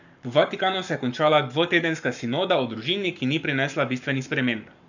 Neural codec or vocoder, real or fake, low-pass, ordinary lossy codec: codec, 44.1 kHz, 7.8 kbps, Pupu-Codec; fake; 7.2 kHz; none